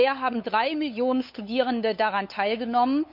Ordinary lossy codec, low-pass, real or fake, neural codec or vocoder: none; 5.4 kHz; fake; codec, 16 kHz, 8 kbps, FunCodec, trained on LibriTTS, 25 frames a second